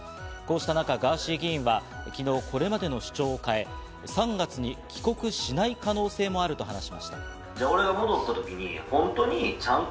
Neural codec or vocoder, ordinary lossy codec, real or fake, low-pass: none; none; real; none